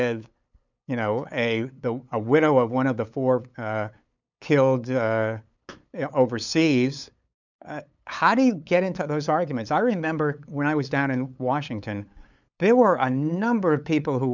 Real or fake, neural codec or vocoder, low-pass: fake; codec, 16 kHz, 8 kbps, FunCodec, trained on LibriTTS, 25 frames a second; 7.2 kHz